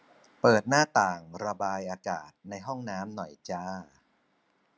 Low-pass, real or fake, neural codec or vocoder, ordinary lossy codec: none; real; none; none